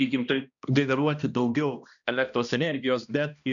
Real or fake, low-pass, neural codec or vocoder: fake; 7.2 kHz; codec, 16 kHz, 1 kbps, X-Codec, HuBERT features, trained on balanced general audio